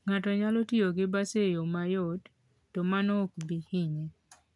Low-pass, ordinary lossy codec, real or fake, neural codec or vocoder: 10.8 kHz; none; real; none